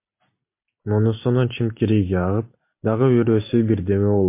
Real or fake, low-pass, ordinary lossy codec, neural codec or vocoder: real; 3.6 kHz; MP3, 24 kbps; none